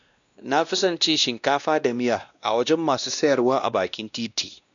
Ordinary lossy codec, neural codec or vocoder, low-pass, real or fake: none; codec, 16 kHz, 1 kbps, X-Codec, WavLM features, trained on Multilingual LibriSpeech; 7.2 kHz; fake